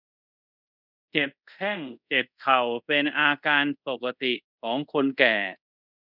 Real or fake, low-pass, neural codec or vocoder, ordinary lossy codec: fake; 5.4 kHz; codec, 24 kHz, 0.5 kbps, DualCodec; none